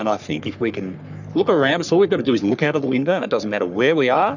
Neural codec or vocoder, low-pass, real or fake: codec, 44.1 kHz, 3.4 kbps, Pupu-Codec; 7.2 kHz; fake